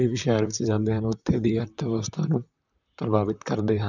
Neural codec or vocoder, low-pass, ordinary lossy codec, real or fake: vocoder, 44.1 kHz, 128 mel bands, Pupu-Vocoder; 7.2 kHz; none; fake